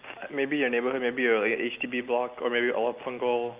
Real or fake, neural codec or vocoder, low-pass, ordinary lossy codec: real; none; 3.6 kHz; Opus, 24 kbps